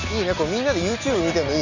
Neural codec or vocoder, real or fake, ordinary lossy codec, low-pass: none; real; none; 7.2 kHz